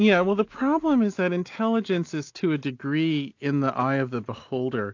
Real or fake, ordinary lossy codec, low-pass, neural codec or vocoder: real; AAC, 48 kbps; 7.2 kHz; none